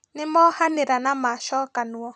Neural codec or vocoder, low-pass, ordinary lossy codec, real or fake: vocoder, 44.1 kHz, 128 mel bands every 256 samples, BigVGAN v2; 9.9 kHz; none; fake